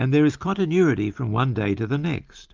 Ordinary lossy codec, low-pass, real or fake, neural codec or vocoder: Opus, 24 kbps; 7.2 kHz; real; none